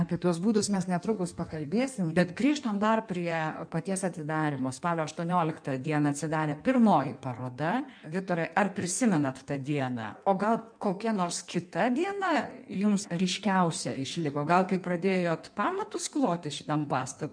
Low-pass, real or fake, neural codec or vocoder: 9.9 kHz; fake; codec, 16 kHz in and 24 kHz out, 1.1 kbps, FireRedTTS-2 codec